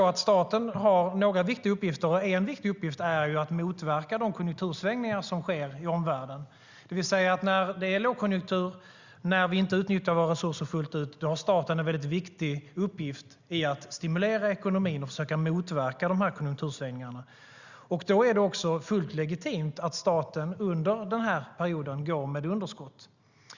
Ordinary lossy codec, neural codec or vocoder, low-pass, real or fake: Opus, 64 kbps; none; 7.2 kHz; real